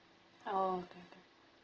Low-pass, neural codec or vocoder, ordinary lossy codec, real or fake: 7.2 kHz; none; Opus, 16 kbps; real